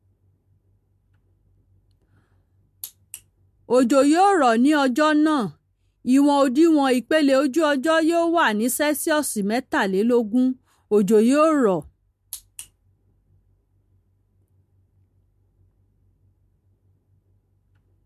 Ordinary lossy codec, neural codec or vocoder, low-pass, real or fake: MP3, 64 kbps; none; 14.4 kHz; real